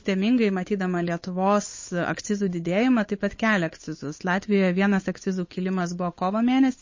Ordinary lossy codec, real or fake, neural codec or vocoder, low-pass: MP3, 32 kbps; fake; codec, 16 kHz, 8 kbps, FunCodec, trained on Chinese and English, 25 frames a second; 7.2 kHz